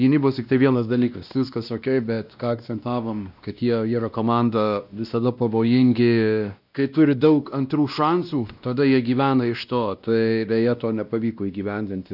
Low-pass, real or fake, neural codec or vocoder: 5.4 kHz; fake; codec, 16 kHz, 1 kbps, X-Codec, WavLM features, trained on Multilingual LibriSpeech